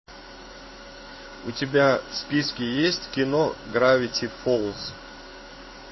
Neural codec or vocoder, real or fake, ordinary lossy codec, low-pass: codec, 16 kHz in and 24 kHz out, 1 kbps, XY-Tokenizer; fake; MP3, 24 kbps; 7.2 kHz